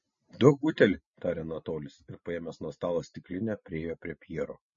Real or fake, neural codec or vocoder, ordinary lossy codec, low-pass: real; none; MP3, 32 kbps; 7.2 kHz